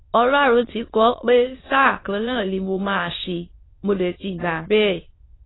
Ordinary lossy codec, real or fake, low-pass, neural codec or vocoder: AAC, 16 kbps; fake; 7.2 kHz; autoencoder, 22.05 kHz, a latent of 192 numbers a frame, VITS, trained on many speakers